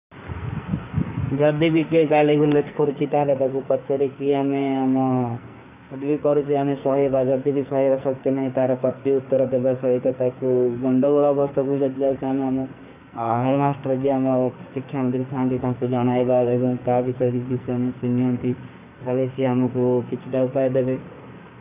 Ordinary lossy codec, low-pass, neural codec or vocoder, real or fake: none; 3.6 kHz; codec, 44.1 kHz, 2.6 kbps, SNAC; fake